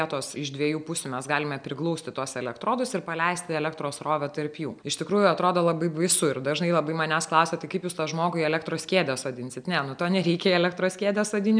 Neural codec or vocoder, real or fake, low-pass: none; real; 9.9 kHz